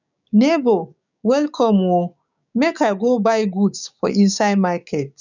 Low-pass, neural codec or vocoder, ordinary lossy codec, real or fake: 7.2 kHz; codec, 16 kHz, 6 kbps, DAC; none; fake